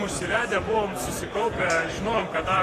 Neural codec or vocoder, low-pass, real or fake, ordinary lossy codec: vocoder, 44.1 kHz, 128 mel bands, Pupu-Vocoder; 14.4 kHz; fake; AAC, 48 kbps